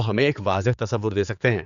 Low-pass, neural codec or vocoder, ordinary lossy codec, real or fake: 7.2 kHz; codec, 16 kHz, 4 kbps, X-Codec, HuBERT features, trained on general audio; none; fake